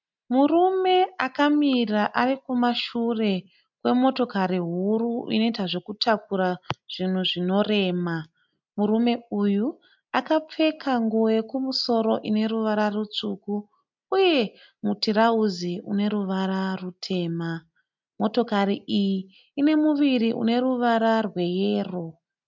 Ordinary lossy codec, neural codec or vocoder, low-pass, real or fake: MP3, 64 kbps; none; 7.2 kHz; real